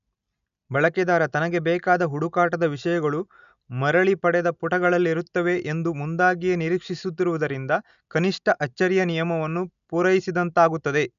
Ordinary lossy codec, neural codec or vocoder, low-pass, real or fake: none; none; 7.2 kHz; real